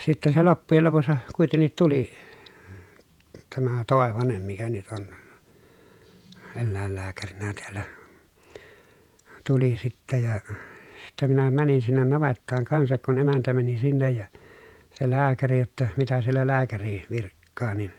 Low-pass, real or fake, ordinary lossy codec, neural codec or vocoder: 19.8 kHz; fake; none; vocoder, 48 kHz, 128 mel bands, Vocos